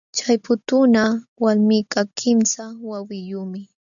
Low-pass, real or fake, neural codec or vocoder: 7.2 kHz; real; none